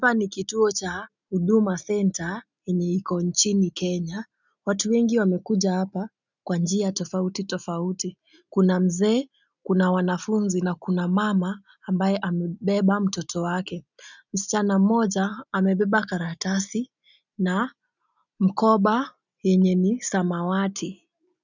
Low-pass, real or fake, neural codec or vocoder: 7.2 kHz; real; none